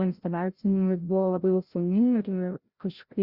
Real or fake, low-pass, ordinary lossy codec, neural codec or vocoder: fake; 5.4 kHz; Opus, 64 kbps; codec, 16 kHz, 0.5 kbps, FreqCodec, larger model